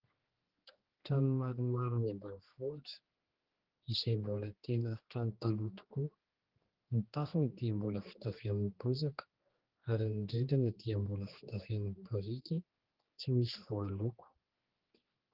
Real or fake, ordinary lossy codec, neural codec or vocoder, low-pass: fake; Opus, 16 kbps; codec, 16 kHz, 2 kbps, X-Codec, HuBERT features, trained on general audio; 5.4 kHz